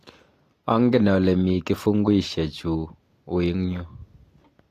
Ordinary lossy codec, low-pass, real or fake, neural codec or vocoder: AAC, 48 kbps; 14.4 kHz; real; none